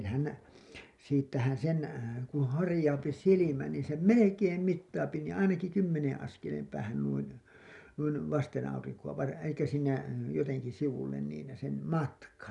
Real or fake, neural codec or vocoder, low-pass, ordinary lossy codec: real; none; 10.8 kHz; none